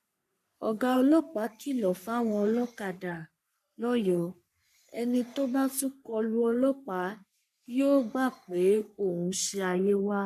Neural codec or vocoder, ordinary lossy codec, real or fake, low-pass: codec, 44.1 kHz, 3.4 kbps, Pupu-Codec; none; fake; 14.4 kHz